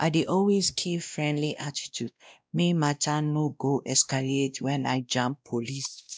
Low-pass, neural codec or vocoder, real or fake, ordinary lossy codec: none; codec, 16 kHz, 1 kbps, X-Codec, WavLM features, trained on Multilingual LibriSpeech; fake; none